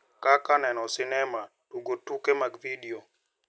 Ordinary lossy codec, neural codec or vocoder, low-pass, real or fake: none; none; none; real